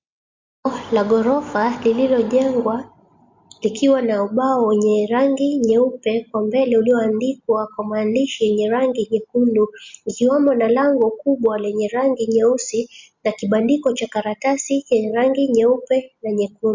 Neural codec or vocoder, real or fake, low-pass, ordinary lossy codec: none; real; 7.2 kHz; MP3, 64 kbps